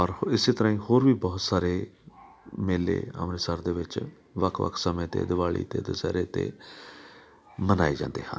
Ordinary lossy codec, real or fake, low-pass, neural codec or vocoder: none; real; none; none